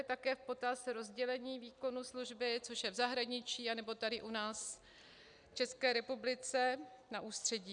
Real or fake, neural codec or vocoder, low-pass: real; none; 9.9 kHz